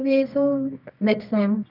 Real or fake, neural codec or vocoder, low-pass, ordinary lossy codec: fake; codec, 24 kHz, 0.9 kbps, WavTokenizer, medium music audio release; 5.4 kHz; none